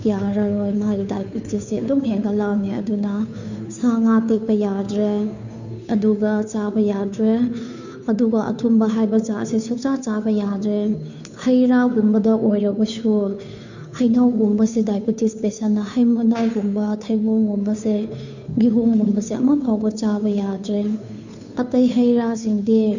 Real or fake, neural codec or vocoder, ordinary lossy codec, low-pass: fake; codec, 16 kHz, 2 kbps, FunCodec, trained on Chinese and English, 25 frames a second; none; 7.2 kHz